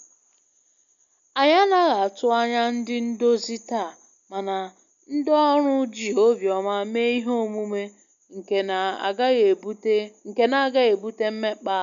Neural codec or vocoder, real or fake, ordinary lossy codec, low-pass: none; real; MP3, 64 kbps; 7.2 kHz